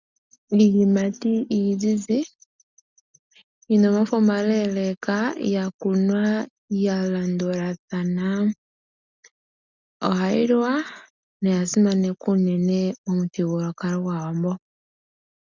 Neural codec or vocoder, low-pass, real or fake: none; 7.2 kHz; real